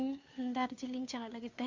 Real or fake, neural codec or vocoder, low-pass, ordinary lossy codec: fake; codec, 16 kHz, 2 kbps, FunCodec, trained on Chinese and English, 25 frames a second; 7.2 kHz; MP3, 48 kbps